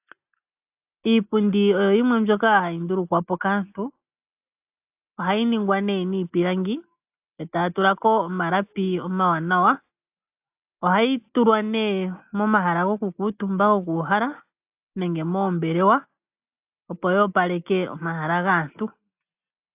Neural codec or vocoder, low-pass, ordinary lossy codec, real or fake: none; 3.6 kHz; AAC, 32 kbps; real